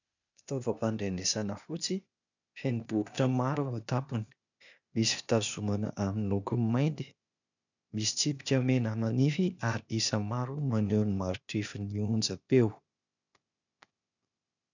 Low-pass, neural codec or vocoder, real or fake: 7.2 kHz; codec, 16 kHz, 0.8 kbps, ZipCodec; fake